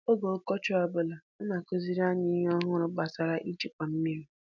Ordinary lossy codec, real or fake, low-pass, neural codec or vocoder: none; real; 7.2 kHz; none